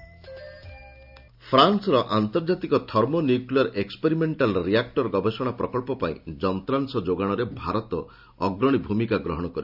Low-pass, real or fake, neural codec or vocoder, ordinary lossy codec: 5.4 kHz; real; none; none